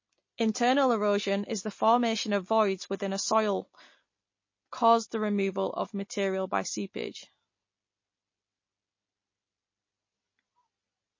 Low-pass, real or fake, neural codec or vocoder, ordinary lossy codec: 7.2 kHz; real; none; MP3, 32 kbps